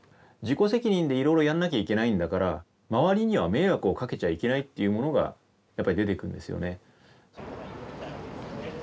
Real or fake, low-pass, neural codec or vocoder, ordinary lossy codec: real; none; none; none